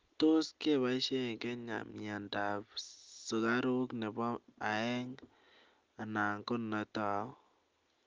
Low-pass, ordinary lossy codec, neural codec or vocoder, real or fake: 7.2 kHz; Opus, 24 kbps; none; real